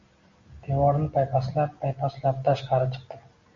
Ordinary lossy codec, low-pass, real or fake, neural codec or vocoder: MP3, 48 kbps; 7.2 kHz; real; none